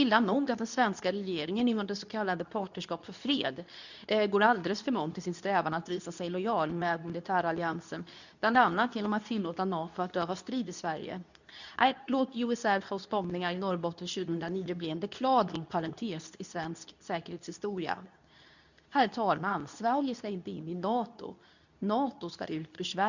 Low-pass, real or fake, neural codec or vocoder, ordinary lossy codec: 7.2 kHz; fake; codec, 24 kHz, 0.9 kbps, WavTokenizer, medium speech release version 2; none